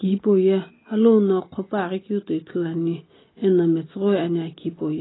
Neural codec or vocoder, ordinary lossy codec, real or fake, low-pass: none; AAC, 16 kbps; real; 7.2 kHz